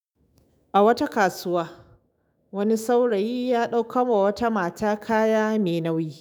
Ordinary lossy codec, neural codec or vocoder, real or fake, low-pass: none; autoencoder, 48 kHz, 128 numbers a frame, DAC-VAE, trained on Japanese speech; fake; none